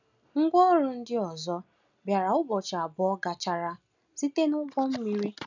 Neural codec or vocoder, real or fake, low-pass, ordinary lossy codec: none; real; 7.2 kHz; none